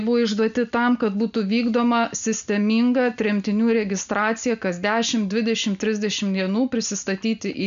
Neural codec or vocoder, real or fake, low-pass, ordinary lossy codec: none; real; 7.2 kHz; MP3, 64 kbps